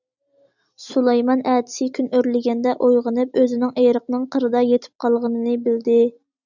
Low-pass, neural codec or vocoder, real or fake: 7.2 kHz; none; real